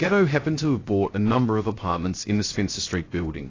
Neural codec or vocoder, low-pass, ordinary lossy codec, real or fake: codec, 16 kHz, 0.3 kbps, FocalCodec; 7.2 kHz; AAC, 32 kbps; fake